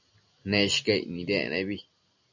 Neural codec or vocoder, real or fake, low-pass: none; real; 7.2 kHz